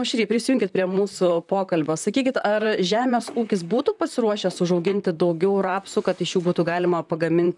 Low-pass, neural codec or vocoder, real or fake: 10.8 kHz; vocoder, 44.1 kHz, 128 mel bands, Pupu-Vocoder; fake